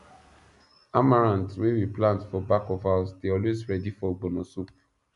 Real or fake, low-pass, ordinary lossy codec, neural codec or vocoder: real; 10.8 kHz; none; none